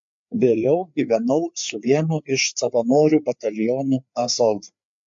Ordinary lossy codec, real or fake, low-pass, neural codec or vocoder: MP3, 48 kbps; fake; 7.2 kHz; codec, 16 kHz, 4 kbps, FreqCodec, larger model